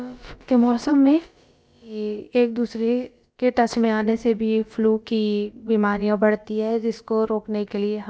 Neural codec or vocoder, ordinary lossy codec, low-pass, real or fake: codec, 16 kHz, about 1 kbps, DyCAST, with the encoder's durations; none; none; fake